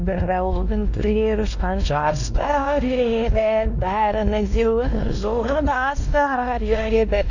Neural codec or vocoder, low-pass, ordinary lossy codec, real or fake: codec, 16 kHz, 1 kbps, X-Codec, WavLM features, trained on Multilingual LibriSpeech; 7.2 kHz; none; fake